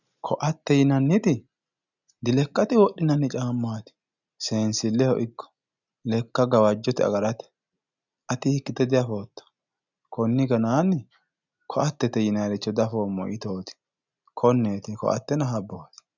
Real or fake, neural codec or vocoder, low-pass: real; none; 7.2 kHz